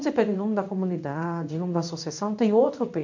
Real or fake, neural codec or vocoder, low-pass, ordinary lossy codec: fake; codec, 16 kHz in and 24 kHz out, 1 kbps, XY-Tokenizer; 7.2 kHz; MP3, 48 kbps